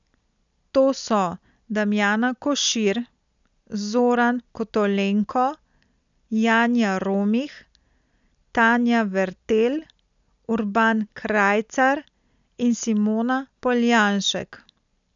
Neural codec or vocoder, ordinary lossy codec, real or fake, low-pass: none; none; real; 7.2 kHz